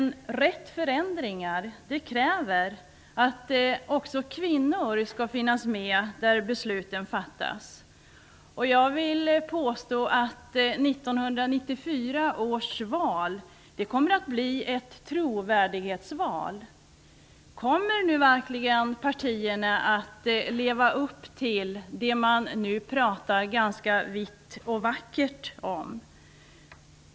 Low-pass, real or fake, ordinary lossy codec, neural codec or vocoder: none; real; none; none